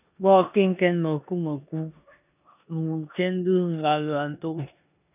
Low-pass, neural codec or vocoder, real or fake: 3.6 kHz; codec, 16 kHz in and 24 kHz out, 0.9 kbps, LongCat-Audio-Codec, four codebook decoder; fake